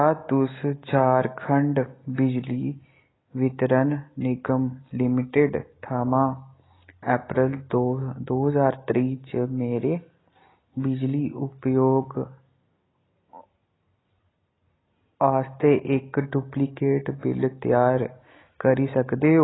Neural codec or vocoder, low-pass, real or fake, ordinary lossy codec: none; 7.2 kHz; real; AAC, 16 kbps